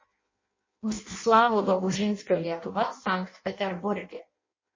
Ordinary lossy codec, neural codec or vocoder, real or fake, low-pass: MP3, 32 kbps; codec, 16 kHz in and 24 kHz out, 0.6 kbps, FireRedTTS-2 codec; fake; 7.2 kHz